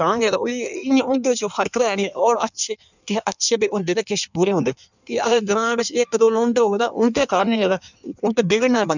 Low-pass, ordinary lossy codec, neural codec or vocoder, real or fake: 7.2 kHz; none; codec, 16 kHz in and 24 kHz out, 1.1 kbps, FireRedTTS-2 codec; fake